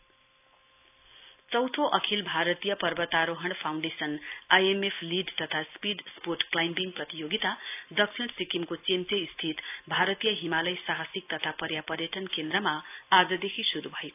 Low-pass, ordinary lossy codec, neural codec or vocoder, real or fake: 3.6 kHz; AAC, 32 kbps; none; real